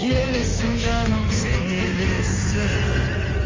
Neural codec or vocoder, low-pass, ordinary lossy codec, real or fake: codec, 16 kHz in and 24 kHz out, 1.1 kbps, FireRedTTS-2 codec; 7.2 kHz; Opus, 32 kbps; fake